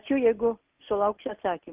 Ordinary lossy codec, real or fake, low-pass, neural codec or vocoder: Opus, 24 kbps; real; 3.6 kHz; none